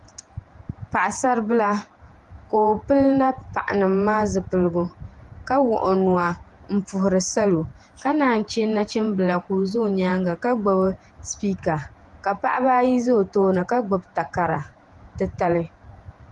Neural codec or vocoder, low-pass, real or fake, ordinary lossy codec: vocoder, 48 kHz, 128 mel bands, Vocos; 10.8 kHz; fake; Opus, 24 kbps